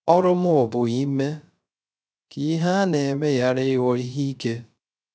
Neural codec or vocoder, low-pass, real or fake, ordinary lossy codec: codec, 16 kHz, 0.3 kbps, FocalCodec; none; fake; none